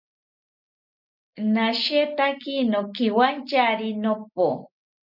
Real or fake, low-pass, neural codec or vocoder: real; 5.4 kHz; none